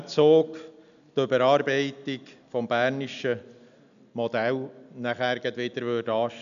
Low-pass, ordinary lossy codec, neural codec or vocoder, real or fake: 7.2 kHz; none; none; real